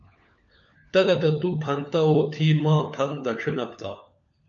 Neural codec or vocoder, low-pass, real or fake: codec, 16 kHz, 4 kbps, FunCodec, trained on LibriTTS, 50 frames a second; 7.2 kHz; fake